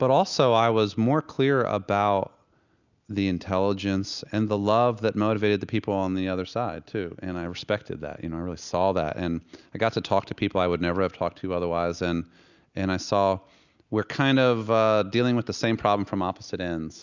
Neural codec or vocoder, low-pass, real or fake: none; 7.2 kHz; real